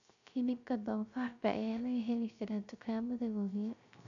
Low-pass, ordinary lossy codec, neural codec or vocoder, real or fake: 7.2 kHz; none; codec, 16 kHz, 0.3 kbps, FocalCodec; fake